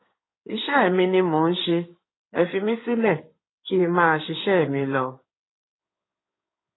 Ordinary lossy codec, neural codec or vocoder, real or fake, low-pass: AAC, 16 kbps; codec, 16 kHz in and 24 kHz out, 2.2 kbps, FireRedTTS-2 codec; fake; 7.2 kHz